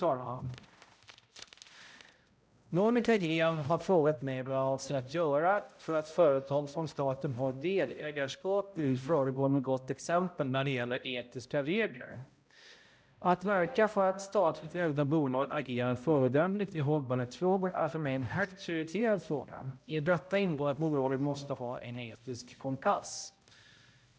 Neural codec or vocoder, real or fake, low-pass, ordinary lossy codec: codec, 16 kHz, 0.5 kbps, X-Codec, HuBERT features, trained on balanced general audio; fake; none; none